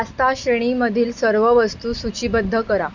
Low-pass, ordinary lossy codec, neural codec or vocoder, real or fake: 7.2 kHz; none; none; real